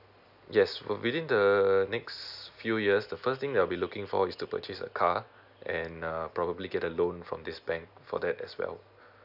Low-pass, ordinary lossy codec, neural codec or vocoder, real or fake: 5.4 kHz; none; none; real